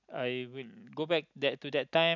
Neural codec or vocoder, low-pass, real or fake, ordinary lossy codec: none; 7.2 kHz; real; none